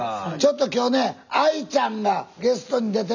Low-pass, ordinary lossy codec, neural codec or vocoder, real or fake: 7.2 kHz; none; none; real